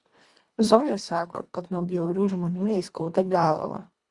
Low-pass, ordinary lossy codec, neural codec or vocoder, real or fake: 10.8 kHz; Opus, 64 kbps; codec, 24 kHz, 1.5 kbps, HILCodec; fake